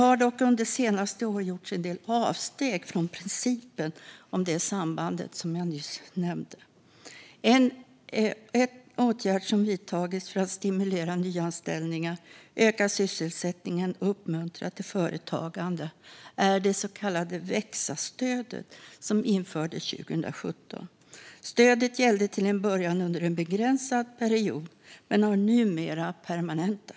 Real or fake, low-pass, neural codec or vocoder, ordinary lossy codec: real; none; none; none